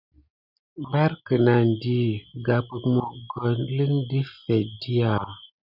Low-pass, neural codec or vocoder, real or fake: 5.4 kHz; none; real